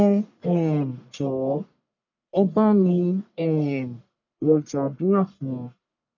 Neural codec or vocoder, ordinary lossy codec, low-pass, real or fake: codec, 44.1 kHz, 1.7 kbps, Pupu-Codec; none; 7.2 kHz; fake